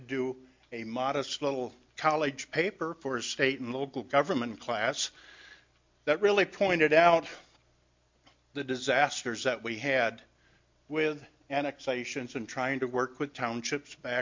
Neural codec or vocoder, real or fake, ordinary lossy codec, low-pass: none; real; MP3, 48 kbps; 7.2 kHz